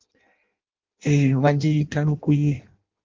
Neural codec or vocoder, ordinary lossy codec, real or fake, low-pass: codec, 16 kHz in and 24 kHz out, 0.6 kbps, FireRedTTS-2 codec; Opus, 32 kbps; fake; 7.2 kHz